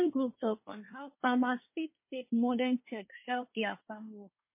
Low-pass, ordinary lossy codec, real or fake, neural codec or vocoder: 3.6 kHz; MP3, 24 kbps; fake; codec, 24 kHz, 1 kbps, SNAC